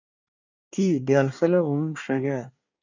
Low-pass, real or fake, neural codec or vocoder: 7.2 kHz; fake; codec, 24 kHz, 1 kbps, SNAC